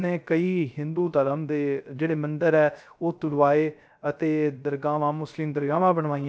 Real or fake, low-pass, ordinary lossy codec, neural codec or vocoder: fake; none; none; codec, 16 kHz, 0.3 kbps, FocalCodec